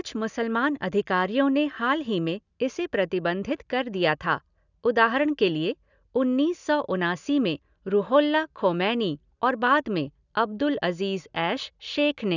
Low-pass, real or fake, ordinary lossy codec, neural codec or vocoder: 7.2 kHz; real; none; none